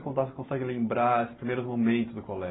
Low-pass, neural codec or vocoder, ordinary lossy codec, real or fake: 7.2 kHz; none; AAC, 16 kbps; real